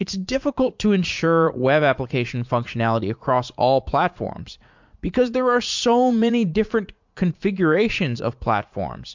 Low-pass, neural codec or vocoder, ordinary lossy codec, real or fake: 7.2 kHz; vocoder, 44.1 kHz, 80 mel bands, Vocos; MP3, 64 kbps; fake